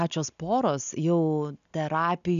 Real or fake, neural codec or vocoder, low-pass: real; none; 7.2 kHz